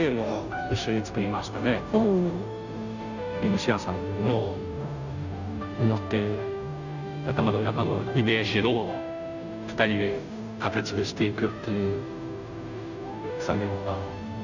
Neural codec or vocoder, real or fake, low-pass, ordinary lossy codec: codec, 16 kHz, 0.5 kbps, FunCodec, trained on Chinese and English, 25 frames a second; fake; 7.2 kHz; none